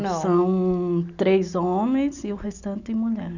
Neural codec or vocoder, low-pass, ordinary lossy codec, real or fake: none; 7.2 kHz; none; real